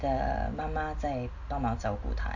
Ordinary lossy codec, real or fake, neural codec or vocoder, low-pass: AAC, 48 kbps; real; none; 7.2 kHz